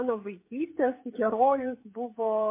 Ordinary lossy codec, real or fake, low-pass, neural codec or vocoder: MP3, 24 kbps; fake; 3.6 kHz; codec, 16 kHz, 16 kbps, FreqCodec, smaller model